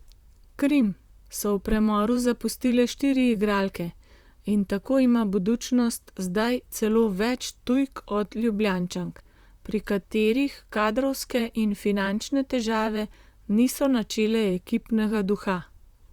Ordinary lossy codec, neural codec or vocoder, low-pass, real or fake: Opus, 64 kbps; vocoder, 44.1 kHz, 128 mel bands, Pupu-Vocoder; 19.8 kHz; fake